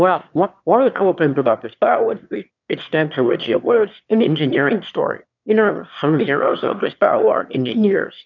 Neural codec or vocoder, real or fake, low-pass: autoencoder, 22.05 kHz, a latent of 192 numbers a frame, VITS, trained on one speaker; fake; 7.2 kHz